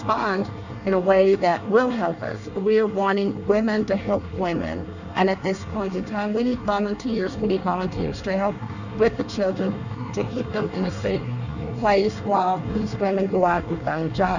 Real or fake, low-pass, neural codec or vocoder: fake; 7.2 kHz; codec, 24 kHz, 1 kbps, SNAC